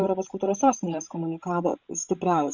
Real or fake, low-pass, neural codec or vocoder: fake; 7.2 kHz; codec, 16 kHz, 16 kbps, FreqCodec, larger model